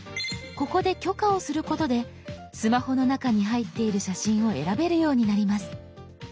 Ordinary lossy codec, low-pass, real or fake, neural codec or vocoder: none; none; real; none